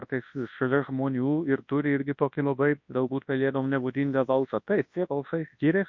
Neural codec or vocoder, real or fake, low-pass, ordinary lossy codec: codec, 24 kHz, 0.9 kbps, WavTokenizer, large speech release; fake; 7.2 kHz; MP3, 48 kbps